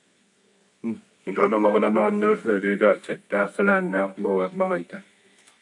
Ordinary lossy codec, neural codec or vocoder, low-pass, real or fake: MP3, 48 kbps; codec, 24 kHz, 0.9 kbps, WavTokenizer, medium music audio release; 10.8 kHz; fake